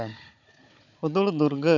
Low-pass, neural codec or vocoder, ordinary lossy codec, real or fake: 7.2 kHz; codec, 16 kHz, 16 kbps, FunCodec, trained on Chinese and English, 50 frames a second; none; fake